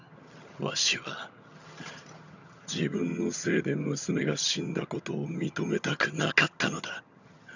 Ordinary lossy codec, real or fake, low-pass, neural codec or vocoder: none; fake; 7.2 kHz; vocoder, 22.05 kHz, 80 mel bands, HiFi-GAN